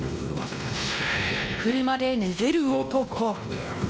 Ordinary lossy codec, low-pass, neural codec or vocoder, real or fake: none; none; codec, 16 kHz, 1 kbps, X-Codec, WavLM features, trained on Multilingual LibriSpeech; fake